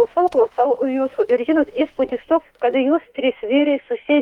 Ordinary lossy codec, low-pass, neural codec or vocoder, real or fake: Opus, 24 kbps; 19.8 kHz; autoencoder, 48 kHz, 32 numbers a frame, DAC-VAE, trained on Japanese speech; fake